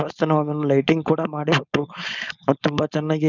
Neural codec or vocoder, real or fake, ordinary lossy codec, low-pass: codec, 16 kHz, 4.8 kbps, FACodec; fake; none; 7.2 kHz